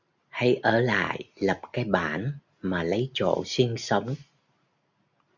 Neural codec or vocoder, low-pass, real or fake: none; 7.2 kHz; real